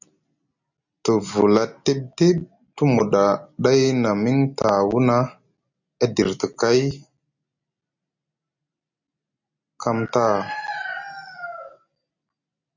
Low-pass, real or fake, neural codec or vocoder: 7.2 kHz; real; none